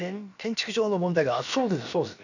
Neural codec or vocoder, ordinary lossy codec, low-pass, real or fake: codec, 16 kHz, about 1 kbps, DyCAST, with the encoder's durations; none; 7.2 kHz; fake